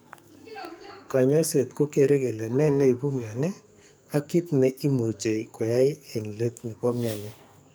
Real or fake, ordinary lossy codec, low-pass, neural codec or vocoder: fake; none; none; codec, 44.1 kHz, 2.6 kbps, SNAC